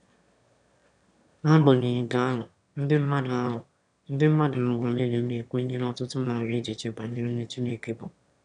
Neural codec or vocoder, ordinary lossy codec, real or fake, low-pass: autoencoder, 22.05 kHz, a latent of 192 numbers a frame, VITS, trained on one speaker; none; fake; 9.9 kHz